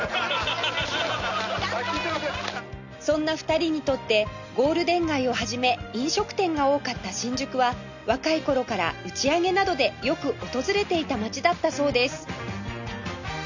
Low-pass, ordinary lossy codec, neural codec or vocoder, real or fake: 7.2 kHz; none; none; real